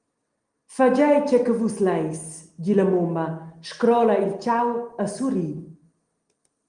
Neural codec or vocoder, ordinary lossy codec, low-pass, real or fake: none; Opus, 24 kbps; 9.9 kHz; real